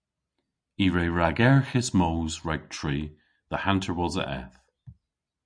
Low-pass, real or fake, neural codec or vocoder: 9.9 kHz; real; none